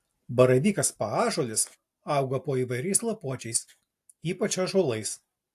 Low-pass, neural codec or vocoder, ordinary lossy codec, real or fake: 14.4 kHz; none; AAC, 64 kbps; real